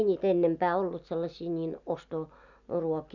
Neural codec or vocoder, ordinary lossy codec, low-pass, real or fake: none; none; 7.2 kHz; real